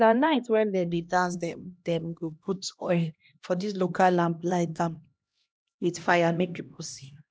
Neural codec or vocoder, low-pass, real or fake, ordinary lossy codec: codec, 16 kHz, 1 kbps, X-Codec, HuBERT features, trained on LibriSpeech; none; fake; none